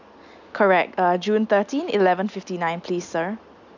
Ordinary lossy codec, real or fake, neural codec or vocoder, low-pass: none; real; none; 7.2 kHz